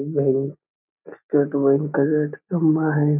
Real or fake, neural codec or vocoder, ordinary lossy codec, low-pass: real; none; none; 3.6 kHz